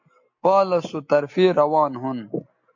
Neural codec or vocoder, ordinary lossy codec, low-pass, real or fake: none; AAC, 48 kbps; 7.2 kHz; real